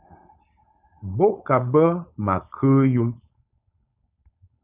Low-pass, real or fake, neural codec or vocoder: 3.6 kHz; fake; codec, 16 kHz, 4.8 kbps, FACodec